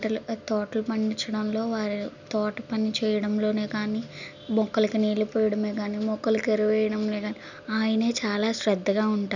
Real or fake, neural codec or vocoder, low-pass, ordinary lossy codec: real; none; 7.2 kHz; none